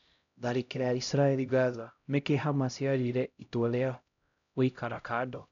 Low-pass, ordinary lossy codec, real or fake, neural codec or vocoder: 7.2 kHz; none; fake; codec, 16 kHz, 0.5 kbps, X-Codec, HuBERT features, trained on LibriSpeech